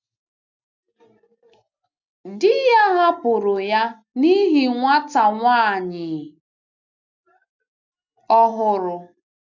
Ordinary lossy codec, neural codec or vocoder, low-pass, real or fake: none; none; 7.2 kHz; real